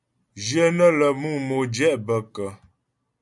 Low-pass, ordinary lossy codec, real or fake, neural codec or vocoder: 10.8 kHz; MP3, 96 kbps; real; none